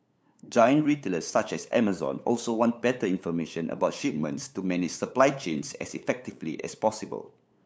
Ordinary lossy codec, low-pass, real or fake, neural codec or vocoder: none; none; fake; codec, 16 kHz, 8 kbps, FunCodec, trained on LibriTTS, 25 frames a second